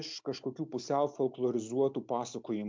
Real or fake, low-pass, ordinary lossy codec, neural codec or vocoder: real; 7.2 kHz; MP3, 64 kbps; none